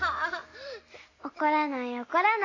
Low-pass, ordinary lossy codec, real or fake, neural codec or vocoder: 7.2 kHz; AAC, 32 kbps; real; none